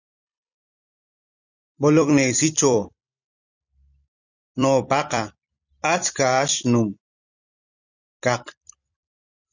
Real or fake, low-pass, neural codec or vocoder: fake; 7.2 kHz; vocoder, 44.1 kHz, 128 mel bands every 256 samples, BigVGAN v2